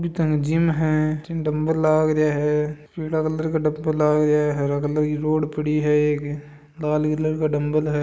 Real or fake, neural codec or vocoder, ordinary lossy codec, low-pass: real; none; none; none